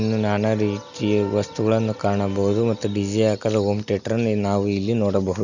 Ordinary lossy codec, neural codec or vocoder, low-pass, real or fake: none; none; 7.2 kHz; real